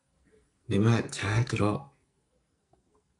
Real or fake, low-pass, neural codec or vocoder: fake; 10.8 kHz; codec, 44.1 kHz, 2.6 kbps, SNAC